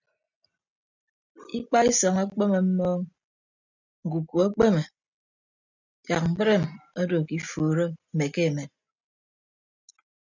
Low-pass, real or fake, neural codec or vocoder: 7.2 kHz; real; none